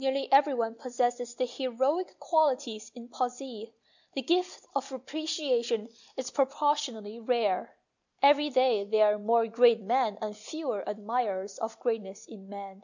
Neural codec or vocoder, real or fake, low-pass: none; real; 7.2 kHz